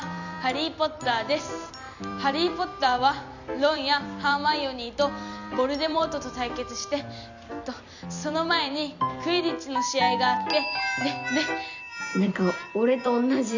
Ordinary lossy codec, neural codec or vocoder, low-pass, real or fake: none; vocoder, 44.1 kHz, 128 mel bands every 256 samples, BigVGAN v2; 7.2 kHz; fake